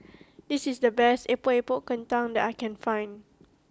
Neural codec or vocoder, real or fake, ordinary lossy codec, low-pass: none; real; none; none